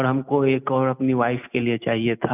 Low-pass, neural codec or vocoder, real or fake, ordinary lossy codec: 3.6 kHz; none; real; none